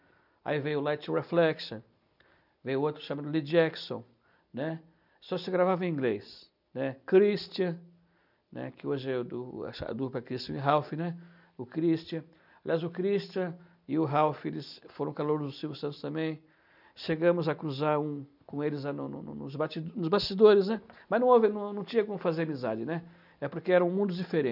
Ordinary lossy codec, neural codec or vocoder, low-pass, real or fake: none; none; 5.4 kHz; real